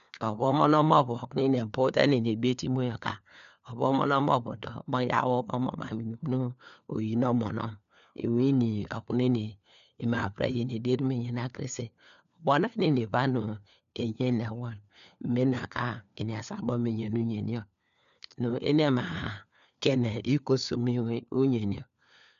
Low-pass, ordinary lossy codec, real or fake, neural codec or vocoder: 7.2 kHz; none; fake; codec, 16 kHz, 4 kbps, FunCodec, trained on LibriTTS, 50 frames a second